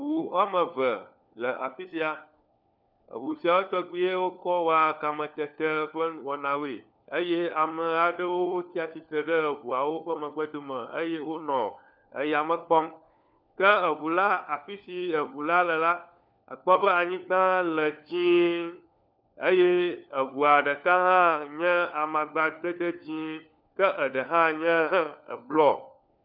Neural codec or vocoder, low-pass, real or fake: codec, 16 kHz, 4 kbps, FunCodec, trained on LibriTTS, 50 frames a second; 5.4 kHz; fake